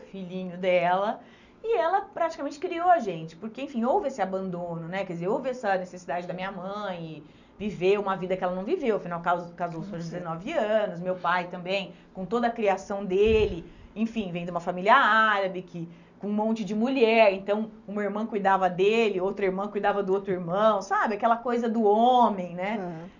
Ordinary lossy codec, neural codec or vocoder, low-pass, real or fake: none; none; 7.2 kHz; real